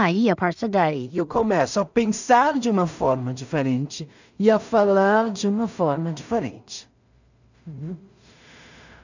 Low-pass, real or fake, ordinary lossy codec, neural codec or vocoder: 7.2 kHz; fake; none; codec, 16 kHz in and 24 kHz out, 0.4 kbps, LongCat-Audio-Codec, two codebook decoder